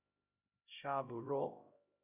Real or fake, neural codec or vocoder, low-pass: fake; codec, 16 kHz, 0.5 kbps, X-Codec, HuBERT features, trained on LibriSpeech; 3.6 kHz